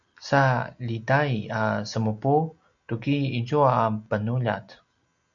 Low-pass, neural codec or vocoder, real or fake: 7.2 kHz; none; real